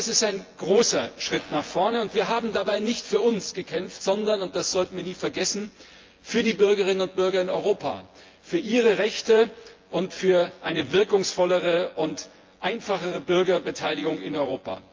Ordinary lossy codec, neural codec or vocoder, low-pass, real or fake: Opus, 32 kbps; vocoder, 24 kHz, 100 mel bands, Vocos; 7.2 kHz; fake